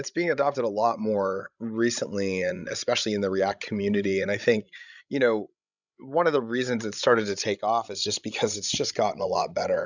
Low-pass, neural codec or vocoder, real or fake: 7.2 kHz; codec, 16 kHz, 16 kbps, FreqCodec, larger model; fake